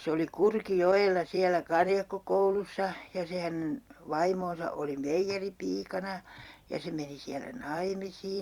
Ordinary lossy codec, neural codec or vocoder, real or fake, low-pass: Opus, 64 kbps; vocoder, 44.1 kHz, 128 mel bands every 256 samples, BigVGAN v2; fake; 19.8 kHz